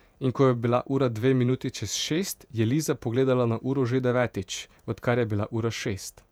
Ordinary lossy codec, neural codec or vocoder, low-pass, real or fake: none; vocoder, 48 kHz, 128 mel bands, Vocos; 19.8 kHz; fake